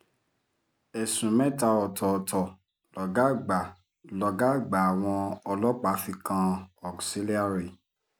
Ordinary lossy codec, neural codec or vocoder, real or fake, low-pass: none; none; real; none